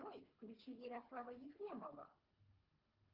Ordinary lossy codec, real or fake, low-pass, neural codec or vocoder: Opus, 32 kbps; fake; 5.4 kHz; codec, 24 kHz, 3 kbps, HILCodec